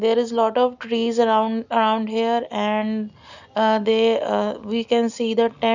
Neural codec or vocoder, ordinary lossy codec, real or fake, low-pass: none; none; real; 7.2 kHz